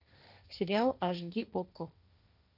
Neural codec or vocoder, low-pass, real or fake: codec, 16 kHz, 1.1 kbps, Voila-Tokenizer; 5.4 kHz; fake